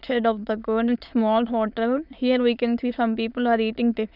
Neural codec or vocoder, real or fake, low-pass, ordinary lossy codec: autoencoder, 22.05 kHz, a latent of 192 numbers a frame, VITS, trained on many speakers; fake; 5.4 kHz; none